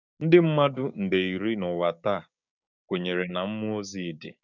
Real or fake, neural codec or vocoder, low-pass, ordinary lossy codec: fake; codec, 16 kHz, 6 kbps, DAC; 7.2 kHz; none